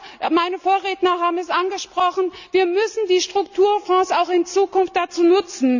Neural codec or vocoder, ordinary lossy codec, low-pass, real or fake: none; none; 7.2 kHz; real